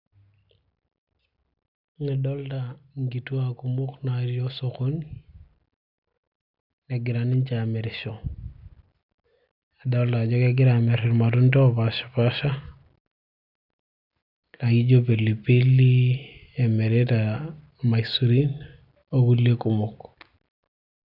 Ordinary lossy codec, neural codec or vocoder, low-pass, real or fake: none; none; 5.4 kHz; real